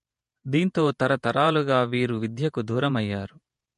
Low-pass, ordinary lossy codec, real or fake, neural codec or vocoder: 14.4 kHz; MP3, 48 kbps; fake; vocoder, 48 kHz, 128 mel bands, Vocos